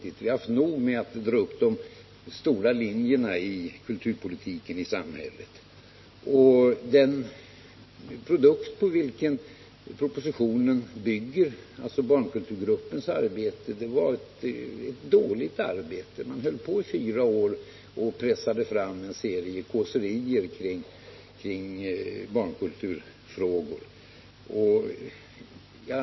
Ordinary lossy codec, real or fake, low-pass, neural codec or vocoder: MP3, 24 kbps; real; 7.2 kHz; none